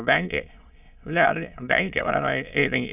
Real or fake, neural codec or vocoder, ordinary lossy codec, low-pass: fake; autoencoder, 22.05 kHz, a latent of 192 numbers a frame, VITS, trained on many speakers; none; 3.6 kHz